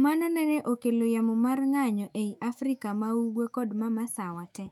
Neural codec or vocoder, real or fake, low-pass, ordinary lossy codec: autoencoder, 48 kHz, 128 numbers a frame, DAC-VAE, trained on Japanese speech; fake; 19.8 kHz; none